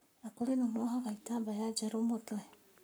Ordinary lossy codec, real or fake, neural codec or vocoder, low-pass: none; fake; codec, 44.1 kHz, 7.8 kbps, Pupu-Codec; none